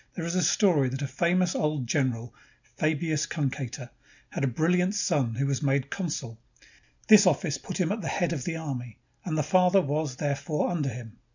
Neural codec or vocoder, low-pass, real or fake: none; 7.2 kHz; real